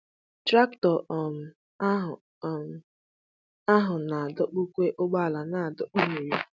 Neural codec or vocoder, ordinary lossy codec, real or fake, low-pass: none; none; real; none